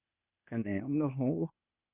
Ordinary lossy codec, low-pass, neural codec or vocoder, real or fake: Opus, 64 kbps; 3.6 kHz; codec, 16 kHz, 0.8 kbps, ZipCodec; fake